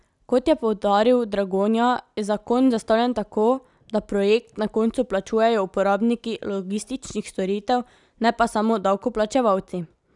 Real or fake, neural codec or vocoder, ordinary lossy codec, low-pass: real; none; none; 10.8 kHz